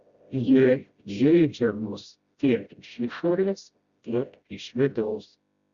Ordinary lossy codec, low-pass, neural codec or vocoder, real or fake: Opus, 64 kbps; 7.2 kHz; codec, 16 kHz, 0.5 kbps, FreqCodec, smaller model; fake